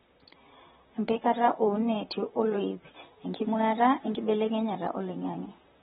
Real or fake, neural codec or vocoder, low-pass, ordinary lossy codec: fake; vocoder, 44.1 kHz, 128 mel bands, Pupu-Vocoder; 19.8 kHz; AAC, 16 kbps